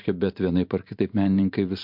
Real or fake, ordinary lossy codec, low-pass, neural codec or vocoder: fake; MP3, 48 kbps; 5.4 kHz; vocoder, 44.1 kHz, 128 mel bands every 512 samples, BigVGAN v2